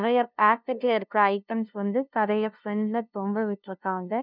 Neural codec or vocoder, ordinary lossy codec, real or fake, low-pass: codec, 16 kHz, 0.5 kbps, FunCodec, trained on LibriTTS, 25 frames a second; none; fake; 5.4 kHz